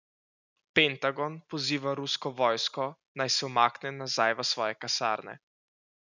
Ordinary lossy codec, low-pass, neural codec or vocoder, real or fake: none; 7.2 kHz; none; real